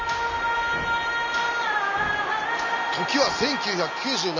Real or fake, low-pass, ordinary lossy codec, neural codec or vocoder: real; 7.2 kHz; none; none